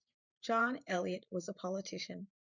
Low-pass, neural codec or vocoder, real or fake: 7.2 kHz; vocoder, 44.1 kHz, 128 mel bands every 512 samples, BigVGAN v2; fake